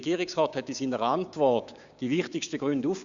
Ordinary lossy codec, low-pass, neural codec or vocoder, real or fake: Opus, 64 kbps; 7.2 kHz; codec, 16 kHz, 6 kbps, DAC; fake